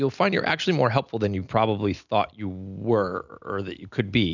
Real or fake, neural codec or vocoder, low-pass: real; none; 7.2 kHz